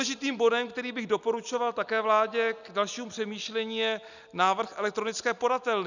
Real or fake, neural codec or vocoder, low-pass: real; none; 7.2 kHz